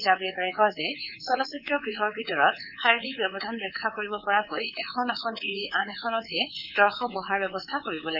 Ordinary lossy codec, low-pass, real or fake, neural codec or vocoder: none; 5.4 kHz; fake; codec, 24 kHz, 3.1 kbps, DualCodec